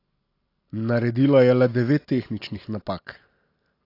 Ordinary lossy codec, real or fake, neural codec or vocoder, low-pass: AAC, 32 kbps; real; none; 5.4 kHz